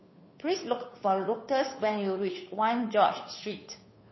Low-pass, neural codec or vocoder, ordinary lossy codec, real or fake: 7.2 kHz; codec, 16 kHz, 2 kbps, FunCodec, trained on Chinese and English, 25 frames a second; MP3, 24 kbps; fake